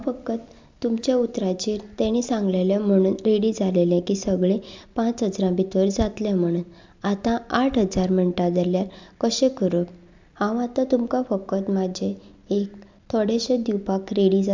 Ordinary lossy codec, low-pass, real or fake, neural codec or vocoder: MP3, 64 kbps; 7.2 kHz; real; none